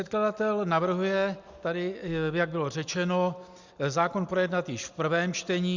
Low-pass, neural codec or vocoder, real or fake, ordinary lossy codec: 7.2 kHz; none; real; Opus, 64 kbps